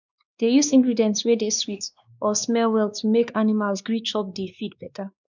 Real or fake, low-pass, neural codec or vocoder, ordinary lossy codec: fake; 7.2 kHz; codec, 16 kHz, 2 kbps, X-Codec, WavLM features, trained on Multilingual LibriSpeech; none